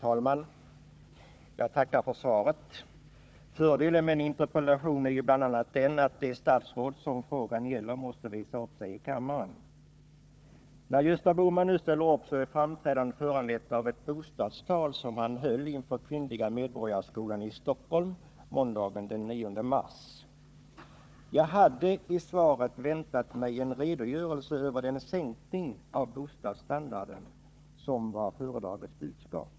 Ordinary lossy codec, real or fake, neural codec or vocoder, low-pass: none; fake; codec, 16 kHz, 4 kbps, FunCodec, trained on Chinese and English, 50 frames a second; none